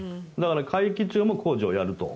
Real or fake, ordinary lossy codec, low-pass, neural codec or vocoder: real; none; none; none